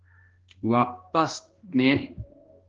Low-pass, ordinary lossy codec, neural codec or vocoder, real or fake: 7.2 kHz; Opus, 16 kbps; codec, 16 kHz, 1 kbps, X-Codec, HuBERT features, trained on balanced general audio; fake